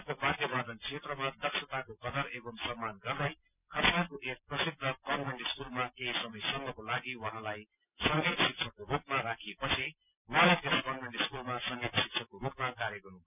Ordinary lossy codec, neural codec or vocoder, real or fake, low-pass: none; none; real; 3.6 kHz